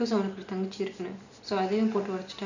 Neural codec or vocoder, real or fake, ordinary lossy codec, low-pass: none; real; none; 7.2 kHz